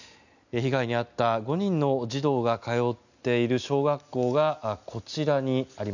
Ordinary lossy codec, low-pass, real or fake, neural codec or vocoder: none; 7.2 kHz; real; none